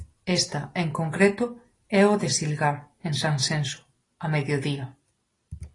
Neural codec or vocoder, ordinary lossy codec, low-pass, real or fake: none; AAC, 32 kbps; 10.8 kHz; real